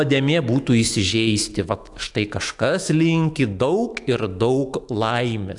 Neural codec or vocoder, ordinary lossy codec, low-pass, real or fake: autoencoder, 48 kHz, 128 numbers a frame, DAC-VAE, trained on Japanese speech; AAC, 64 kbps; 10.8 kHz; fake